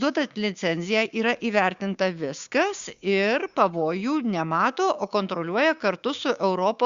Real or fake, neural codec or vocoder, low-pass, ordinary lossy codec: fake; codec, 16 kHz, 6 kbps, DAC; 7.2 kHz; Opus, 64 kbps